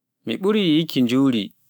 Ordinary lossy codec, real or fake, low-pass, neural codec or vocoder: none; fake; none; autoencoder, 48 kHz, 128 numbers a frame, DAC-VAE, trained on Japanese speech